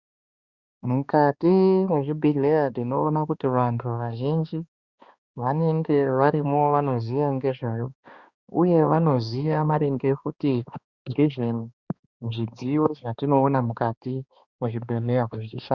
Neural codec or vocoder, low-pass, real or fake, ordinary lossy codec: codec, 16 kHz, 2 kbps, X-Codec, HuBERT features, trained on balanced general audio; 7.2 kHz; fake; Opus, 64 kbps